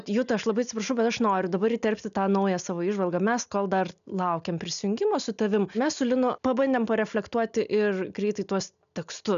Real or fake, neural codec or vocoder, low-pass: real; none; 7.2 kHz